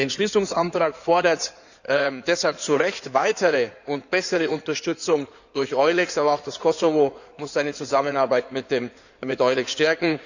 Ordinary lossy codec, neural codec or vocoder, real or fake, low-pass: none; codec, 16 kHz in and 24 kHz out, 2.2 kbps, FireRedTTS-2 codec; fake; 7.2 kHz